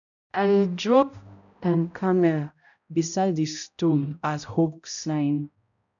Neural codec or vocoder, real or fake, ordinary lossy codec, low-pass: codec, 16 kHz, 0.5 kbps, X-Codec, HuBERT features, trained on balanced general audio; fake; none; 7.2 kHz